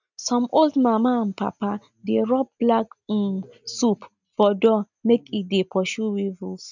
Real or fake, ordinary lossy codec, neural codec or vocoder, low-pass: real; none; none; 7.2 kHz